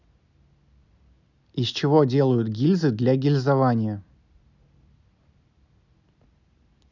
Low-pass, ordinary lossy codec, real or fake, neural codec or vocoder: 7.2 kHz; none; real; none